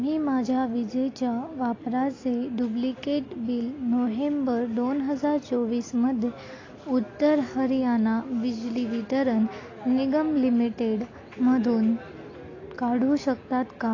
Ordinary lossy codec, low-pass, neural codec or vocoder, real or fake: AAC, 32 kbps; 7.2 kHz; none; real